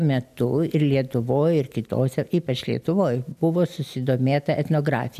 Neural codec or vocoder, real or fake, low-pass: none; real; 14.4 kHz